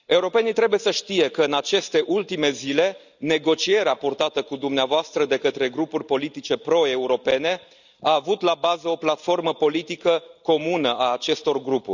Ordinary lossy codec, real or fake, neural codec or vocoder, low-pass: none; real; none; 7.2 kHz